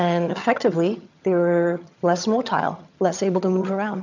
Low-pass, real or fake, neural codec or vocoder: 7.2 kHz; fake; vocoder, 22.05 kHz, 80 mel bands, HiFi-GAN